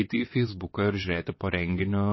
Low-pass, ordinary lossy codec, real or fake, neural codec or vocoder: 7.2 kHz; MP3, 24 kbps; fake; vocoder, 22.05 kHz, 80 mel bands, WaveNeXt